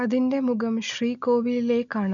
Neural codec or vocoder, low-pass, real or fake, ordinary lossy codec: none; 7.2 kHz; real; none